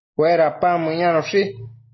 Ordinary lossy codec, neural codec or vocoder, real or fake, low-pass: MP3, 24 kbps; none; real; 7.2 kHz